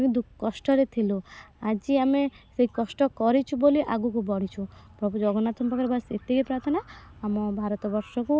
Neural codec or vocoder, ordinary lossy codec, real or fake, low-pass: none; none; real; none